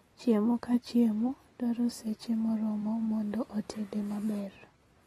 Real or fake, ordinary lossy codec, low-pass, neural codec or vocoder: real; AAC, 32 kbps; 19.8 kHz; none